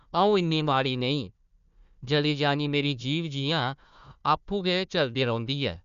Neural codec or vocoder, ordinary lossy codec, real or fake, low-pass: codec, 16 kHz, 1 kbps, FunCodec, trained on Chinese and English, 50 frames a second; none; fake; 7.2 kHz